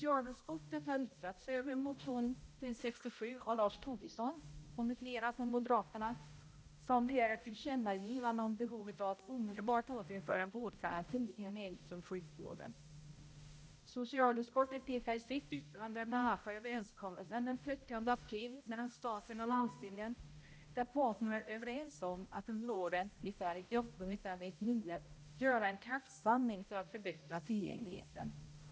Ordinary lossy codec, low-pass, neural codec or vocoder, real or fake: none; none; codec, 16 kHz, 0.5 kbps, X-Codec, HuBERT features, trained on balanced general audio; fake